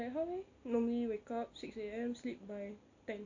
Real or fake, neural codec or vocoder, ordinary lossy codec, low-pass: real; none; AAC, 48 kbps; 7.2 kHz